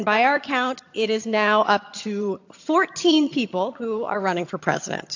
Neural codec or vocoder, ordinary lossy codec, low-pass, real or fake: vocoder, 22.05 kHz, 80 mel bands, HiFi-GAN; AAC, 48 kbps; 7.2 kHz; fake